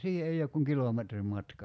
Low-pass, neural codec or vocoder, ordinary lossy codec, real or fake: none; none; none; real